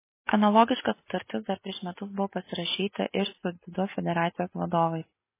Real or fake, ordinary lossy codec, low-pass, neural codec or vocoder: real; MP3, 16 kbps; 3.6 kHz; none